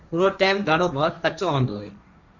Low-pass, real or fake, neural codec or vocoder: 7.2 kHz; fake; codec, 16 kHz, 1.1 kbps, Voila-Tokenizer